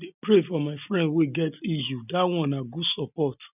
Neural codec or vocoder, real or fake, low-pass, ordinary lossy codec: none; real; 3.6 kHz; none